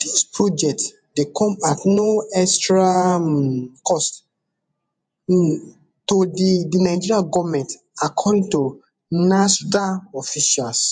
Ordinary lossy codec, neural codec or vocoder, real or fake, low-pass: MP3, 64 kbps; vocoder, 48 kHz, 128 mel bands, Vocos; fake; 9.9 kHz